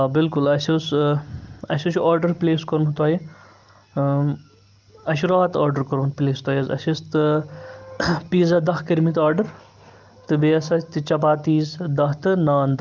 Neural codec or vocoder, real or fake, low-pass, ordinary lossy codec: none; real; 7.2 kHz; Opus, 24 kbps